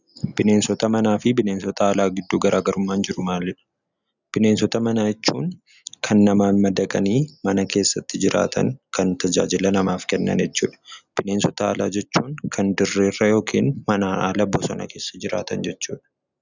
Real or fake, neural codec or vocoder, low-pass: real; none; 7.2 kHz